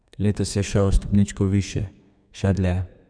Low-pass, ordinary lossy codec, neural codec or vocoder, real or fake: 9.9 kHz; none; codec, 32 kHz, 1.9 kbps, SNAC; fake